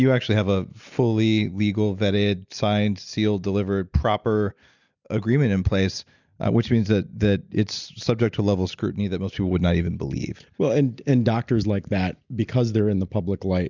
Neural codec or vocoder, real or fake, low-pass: none; real; 7.2 kHz